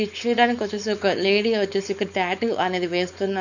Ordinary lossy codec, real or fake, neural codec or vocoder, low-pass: none; fake; codec, 16 kHz, 4.8 kbps, FACodec; 7.2 kHz